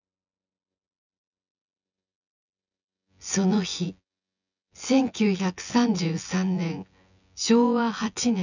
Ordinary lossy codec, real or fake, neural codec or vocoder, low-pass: none; fake; vocoder, 24 kHz, 100 mel bands, Vocos; 7.2 kHz